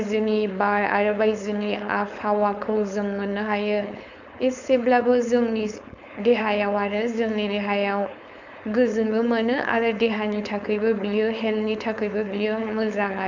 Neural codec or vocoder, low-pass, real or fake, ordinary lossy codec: codec, 16 kHz, 4.8 kbps, FACodec; 7.2 kHz; fake; none